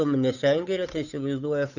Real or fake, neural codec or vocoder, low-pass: fake; codec, 16 kHz, 8 kbps, FunCodec, trained on Chinese and English, 25 frames a second; 7.2 kHz